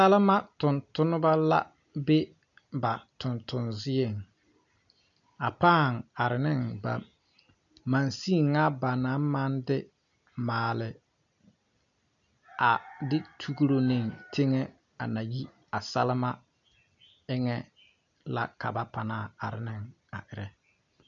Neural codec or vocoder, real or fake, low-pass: none; real; 7.2 kHz